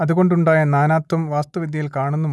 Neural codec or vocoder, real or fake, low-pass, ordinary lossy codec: none; real; none; none